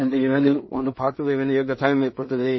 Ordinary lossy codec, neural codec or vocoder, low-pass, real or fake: MP3, 24 kbps; codec, 16 kHz in and 24 kHz out, 0.4 kbps, LongCat-Audio-Codec, two codebook decoder; 7.2 kHz; fake